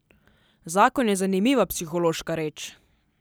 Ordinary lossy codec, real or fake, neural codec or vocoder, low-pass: none; real; none; none